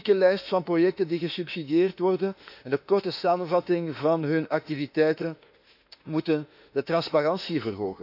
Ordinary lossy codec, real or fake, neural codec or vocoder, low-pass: none; fake; autoencoder, 48 kHz, 32 numbers a frame, DAC-VAE, trained on Japanese speech; 5.4 kHz